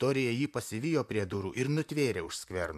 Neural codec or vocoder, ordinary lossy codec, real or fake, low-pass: vocoder, 44.1 kHz, 128 mel bands, Pupu-Vocoder; MP3, 96 kbps; fake; 14.4 kHz